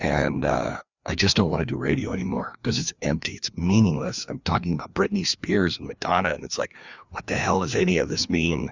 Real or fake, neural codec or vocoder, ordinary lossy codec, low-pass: fake; codec, 16 kHz, 2 kbps, FreqCodec, larger model; Opus, 64 kbps; 7.2 kHz